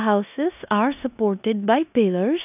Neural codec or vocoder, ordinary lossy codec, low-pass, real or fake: codec, 16 kHz in and 24 kHz out, 0.9 kbps, LongCat-Audio-Codec, four codebook decoder; none; 3.6 kHz; fake